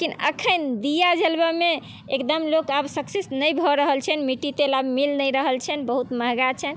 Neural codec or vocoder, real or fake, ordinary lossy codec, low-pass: none; real; none; none